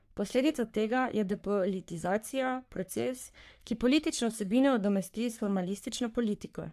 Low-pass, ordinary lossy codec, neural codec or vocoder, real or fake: 14.4 kHz; AAC, 96 kbps; codec, 44.1 kHz, 3.4 kbps, Pupu-Codec; fake